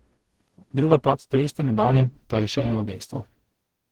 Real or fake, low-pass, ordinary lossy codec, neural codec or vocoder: fake; 19.8 kHz; Opus, 16 kbps; codec, 44.1 kHz, 0.9 kbps, DAC